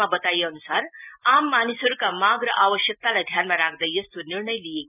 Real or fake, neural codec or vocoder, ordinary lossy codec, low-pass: real; none; none; 3.6 kHz